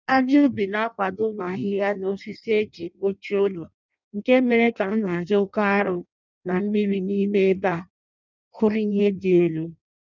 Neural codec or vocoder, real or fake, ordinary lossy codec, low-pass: codec, 16 kHz in and 24 kHz out, 0.6 kbps, FireRedTTS-2 codec; fake; none; 7.2 kHz